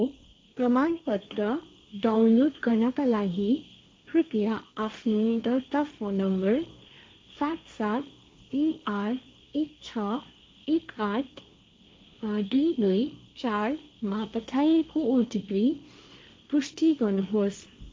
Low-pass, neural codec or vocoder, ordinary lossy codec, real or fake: none; codec, 16 kHz, 1.1 kbps, Voila-Tokenizer; none; fake